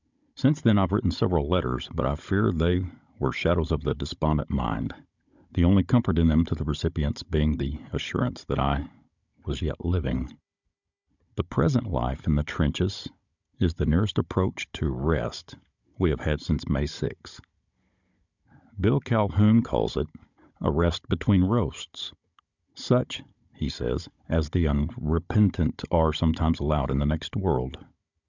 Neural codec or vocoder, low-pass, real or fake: codec, 16 kHz, 16 kbps, FunCodec, trained on Chinese and English, 50 frames a second; 7.2 kHz; fake